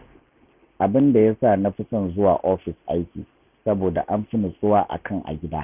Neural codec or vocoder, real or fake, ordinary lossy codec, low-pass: none; real; none; 3.6 kHz